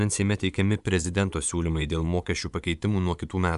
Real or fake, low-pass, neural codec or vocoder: fake; 10.8 kHz; vocoder, 24 kHz, 100 mel bands, Vocos